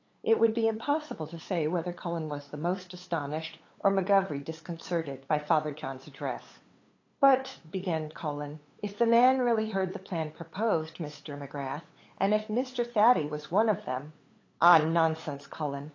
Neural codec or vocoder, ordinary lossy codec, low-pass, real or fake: codec, 16 kHz, 8 kbps, FunCodec, trained on LibriTTS, 25 frames a second; AAC, 32 kbps; 7.2 kHz; fake